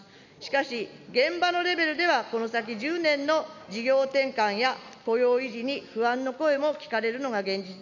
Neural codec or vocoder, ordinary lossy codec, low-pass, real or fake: none; none; 7.2 kHz; real